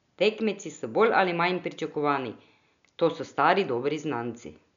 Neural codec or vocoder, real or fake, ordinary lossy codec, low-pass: none; real; none; 7.2 kHz